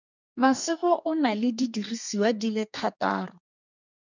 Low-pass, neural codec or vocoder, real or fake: 7.2 kHz; codec, 32 kHz, 1.9 kbps, SNAC; fake